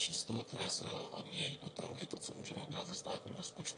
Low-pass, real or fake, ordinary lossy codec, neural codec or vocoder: 9.9 kHz; fake; AAC, 48 kbps; autoencoder, 22.05 kHz, a latent of 192 numbers a frame, VITS, trained on one speaker